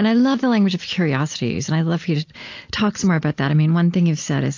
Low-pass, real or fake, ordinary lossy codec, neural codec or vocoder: 7.2 kHz; real; AAC, 48 kbps; none